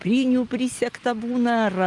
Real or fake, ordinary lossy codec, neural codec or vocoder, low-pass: real; Opus, 32 kbps; none; 10.8 kHz